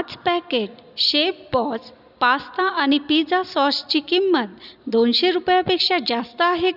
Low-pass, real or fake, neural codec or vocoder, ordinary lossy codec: 5.4 kHz; real; none; none